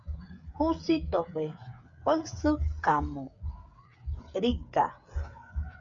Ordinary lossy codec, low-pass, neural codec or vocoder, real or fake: MP3, 96 kbps; 7.2 kHz; codec, 16 kHz, 8 kbps, FreqCodec, smaller model; fake